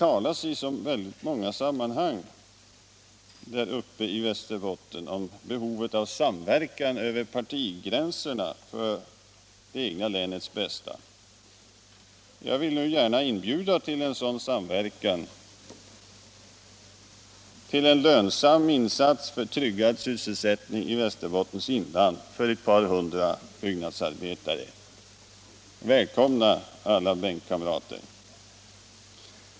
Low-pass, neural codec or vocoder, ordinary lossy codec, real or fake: none; none; none; real